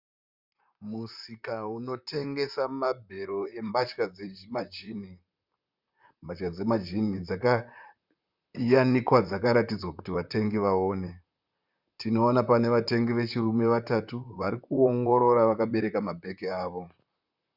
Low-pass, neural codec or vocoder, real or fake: 5.4 kHz; vocoder, 44.1 kHz, 128 mel bands, Pupu-Vocoder; fake